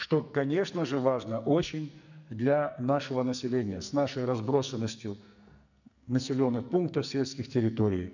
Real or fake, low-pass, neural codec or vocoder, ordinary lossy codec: fake; 7.2 kHz; codec, 44.1 kHz, 2.6 kbps, SNAC; none